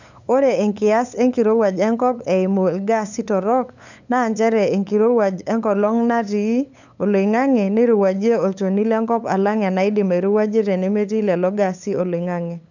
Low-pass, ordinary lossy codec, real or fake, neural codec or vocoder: 7.2 kHz; none; fake; codec, 16 kHz, 8 kbps, FunCodec, trained on LibriTTS, 25 frames a second